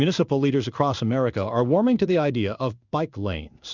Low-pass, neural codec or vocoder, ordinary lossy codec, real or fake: 7.2 kHz; codec, 16 kHz in and 24 kHz out, 1 kbps, XY-Tokenizer; Opus, 64 kbps; fake